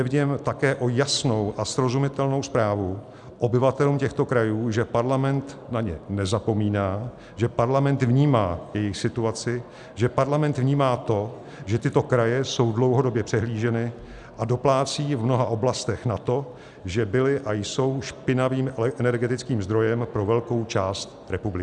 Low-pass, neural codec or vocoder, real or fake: 10.8 kHz; none; real